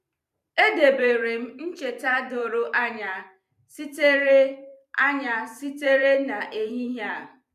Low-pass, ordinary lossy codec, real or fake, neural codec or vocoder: 14.4 kHz; none; fake; vocoder, 44.1 kHz, 128 mel bands every 512 samples, BigVGAN v2